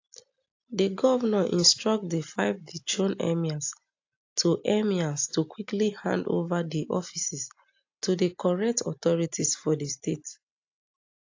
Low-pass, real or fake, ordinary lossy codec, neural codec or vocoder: 7.2 kHz; real; AAC, 48 kbps; none